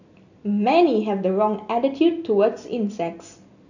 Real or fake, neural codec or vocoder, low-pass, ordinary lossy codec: real; none; 7.2 kHz; none